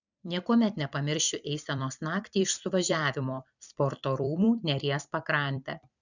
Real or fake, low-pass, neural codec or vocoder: fake; 7.2 kHz; vocoder, 44.1 kHz, 128 mel bands every 256 samples, BigVGAN v2